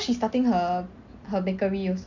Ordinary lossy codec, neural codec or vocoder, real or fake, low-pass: none; none; real; 7.2 kHz